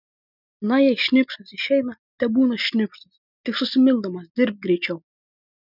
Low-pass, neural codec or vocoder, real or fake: 5.4 kHz; none; real